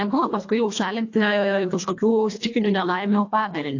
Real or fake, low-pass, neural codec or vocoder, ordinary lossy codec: fake; 7.2 kHz; codec, 24 kHz, 1.5 kbps, HILCodec; AAC, 48 kbps